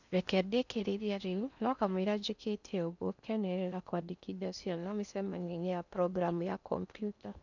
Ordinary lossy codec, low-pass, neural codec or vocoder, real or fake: none; 7.2 kHz; codec, 16 kHz in and 24 kHz out, 0.8 kbps, FocalCodec, streaming, 65536 codes; fake